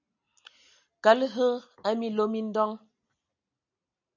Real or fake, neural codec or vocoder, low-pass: real; none; 7.2 kHz